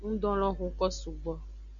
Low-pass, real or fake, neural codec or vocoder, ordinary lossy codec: 7.2 kHz; real; none; MP3, 96 kbps